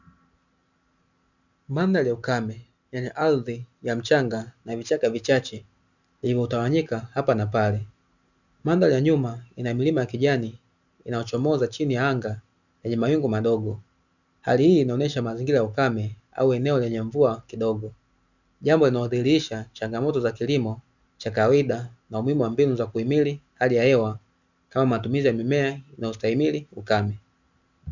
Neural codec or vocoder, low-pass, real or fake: none; 7.2 kHz; real